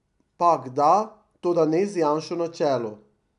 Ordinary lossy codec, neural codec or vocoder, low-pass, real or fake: none; none; 10.8 kHz; real